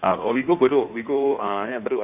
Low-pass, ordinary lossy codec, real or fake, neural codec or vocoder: 3.6 kHz; none; fake; codec, 16 kHz in and 24 kHz out, 1.1 kbps, FireRedTTS-2 codec